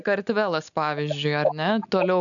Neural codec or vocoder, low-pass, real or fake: none; 7.2 kHz; real